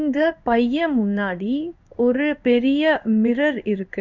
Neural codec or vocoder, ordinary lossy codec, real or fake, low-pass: codec, 16 kHz in and 24 kHz out, 1 kbps, XY-Tokenizer; none; fake; 7.2 kHz